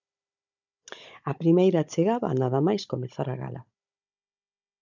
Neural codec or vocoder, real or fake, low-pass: codec, 16 kHz, 16 kbps, FunCodec, trained on Chinese and English, 50 frames a second; fake; 7.2 kHz